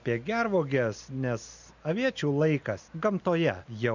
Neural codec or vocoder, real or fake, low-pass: none; real; 7.2 kHz